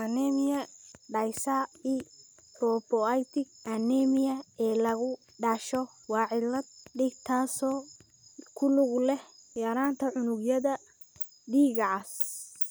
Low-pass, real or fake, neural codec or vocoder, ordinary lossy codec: none; real; none; none